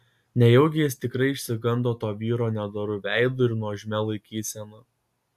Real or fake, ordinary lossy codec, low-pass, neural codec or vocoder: real; AAC, 96 kbps; 14.4 kHz; none